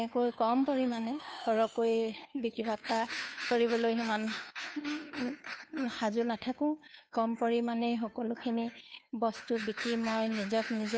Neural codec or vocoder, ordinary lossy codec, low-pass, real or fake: codec, 16 kHz, 2 kbps, FunCodec, trained on Chinese and English, 25 frames a second; none; none; fake